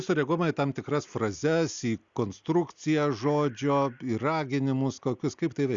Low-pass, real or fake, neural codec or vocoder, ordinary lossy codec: 7.2 kHz; real; none; Opus, 64 kbps